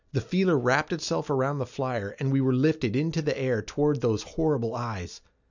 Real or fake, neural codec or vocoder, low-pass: real; none; 7.2 kHz